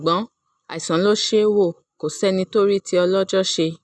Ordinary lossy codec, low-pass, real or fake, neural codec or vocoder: none; none; real; none